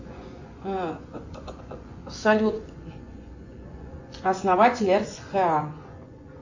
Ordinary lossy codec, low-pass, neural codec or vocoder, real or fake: AAC, 48 kbps; 7.2 kHz; vocoder, 24 kHz, 100 mel bands, Vocos; fake